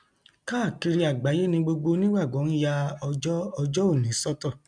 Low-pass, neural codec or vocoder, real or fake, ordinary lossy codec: 9.9 kHz; none; real; none